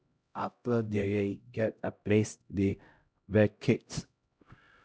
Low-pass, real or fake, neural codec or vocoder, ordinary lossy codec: none; fake; codec, 16 kHz, 0.5 kbps, X-Codec, HuBERT features, trained on LibriSpeech; none